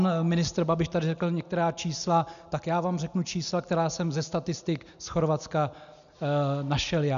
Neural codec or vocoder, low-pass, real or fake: none; 7.2 kHz; real